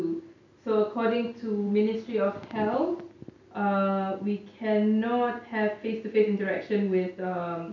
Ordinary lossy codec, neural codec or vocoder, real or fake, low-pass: none; none; real; 7.2 kHz